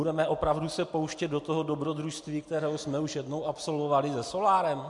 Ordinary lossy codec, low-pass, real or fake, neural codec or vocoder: MP3, 64 kbps; 10.8 kHz; real; none